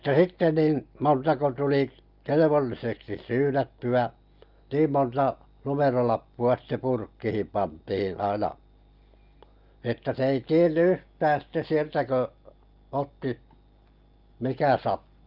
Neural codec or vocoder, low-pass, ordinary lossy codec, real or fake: none; 5.4 kHz; Opus, 24 kbps; real